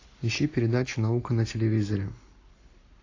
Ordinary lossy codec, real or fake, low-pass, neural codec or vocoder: AAC, 32 kbps; real; 7.2 kHz; none